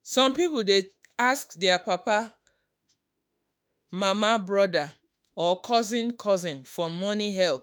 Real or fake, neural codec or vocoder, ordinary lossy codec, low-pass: fake; autoencoder, 48 kHz, 32 numbers a frame, DAC-VAE, trained on Japanese speech; none; none